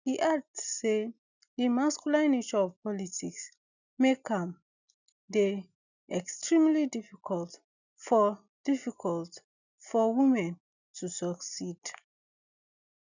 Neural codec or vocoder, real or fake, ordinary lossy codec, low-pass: none; real; none; 7.2 kHz